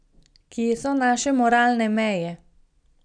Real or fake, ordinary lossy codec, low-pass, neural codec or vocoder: real; none; 9.9 kHz; none